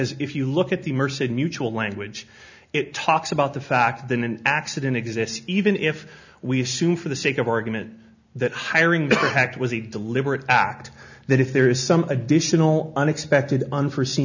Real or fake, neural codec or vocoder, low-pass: real; none; 7.2 kHz